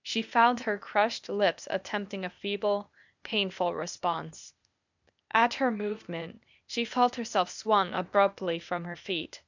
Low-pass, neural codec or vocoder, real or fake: 7.2 kHz; codec, 16 kHz, 0.8 kbps, ZipCodec; fake